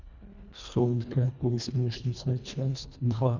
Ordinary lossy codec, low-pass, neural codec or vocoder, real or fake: Opus, 64 kbps; 7.2 kHz; codec, 24 kHz, 1.5 kbps, HILCodec; fake